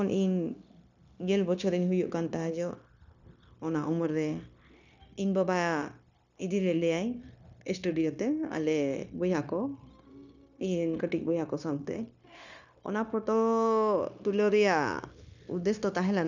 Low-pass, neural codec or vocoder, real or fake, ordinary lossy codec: 7.2 kHz; codec, 16 kHz, 0.9 kbps, LongCat-Audio-Codec; fake; none